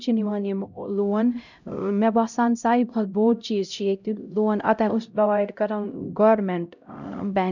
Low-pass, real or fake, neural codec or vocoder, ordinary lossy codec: 7.2 kHz; fake; codec, 16 kHz, 0.5 kbps, X-Codec, HuBERT features, trained on LibriSpeech; none